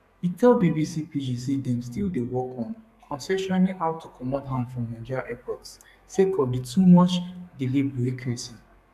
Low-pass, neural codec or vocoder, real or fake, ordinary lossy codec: 14.4 kHz; codec, 32 kHz, 1.9 kbps, SNAC; fake; none